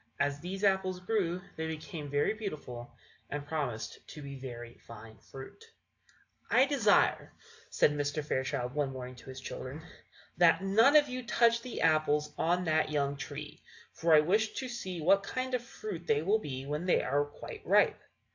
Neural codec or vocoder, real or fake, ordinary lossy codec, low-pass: none; real; AAC, 48 kbps; 7.2 kHz